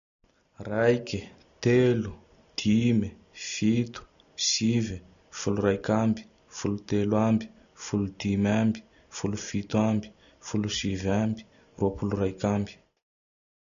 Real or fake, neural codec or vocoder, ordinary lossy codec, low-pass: real; none; none; 7.2 kHz